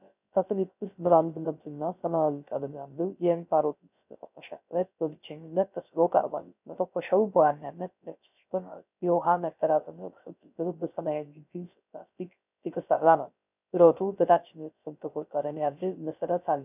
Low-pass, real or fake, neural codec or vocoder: 3.6 kHz; fake; codec, 16 kHz, 0.3 kbps, FocalCodec